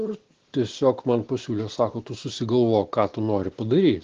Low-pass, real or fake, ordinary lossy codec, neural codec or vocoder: 7.2 kHz; real; Opus, 16 kbps; none